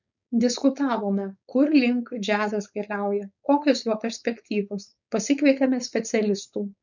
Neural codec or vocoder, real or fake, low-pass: codec, 16 kHz, 4.8 kbps, FACodec; fake; 7.2 kHz